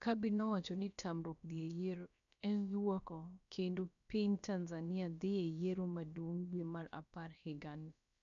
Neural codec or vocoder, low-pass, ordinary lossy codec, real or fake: codec, 16 kHz, about 1 kbps, DyCAST, with the encoder's durations; 7.2 kHz; none; fake